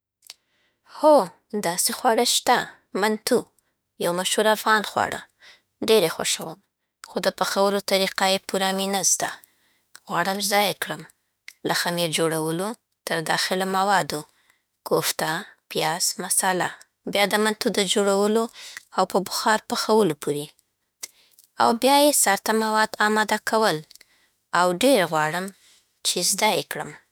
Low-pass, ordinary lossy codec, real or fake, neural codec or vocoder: none; none; fake; autoencoder, 48 kHz, 32 numbers a frame, DAC-VAE, trained on Japanese speech